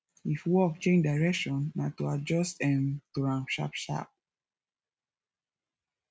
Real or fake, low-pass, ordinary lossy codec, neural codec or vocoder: real; none; none; none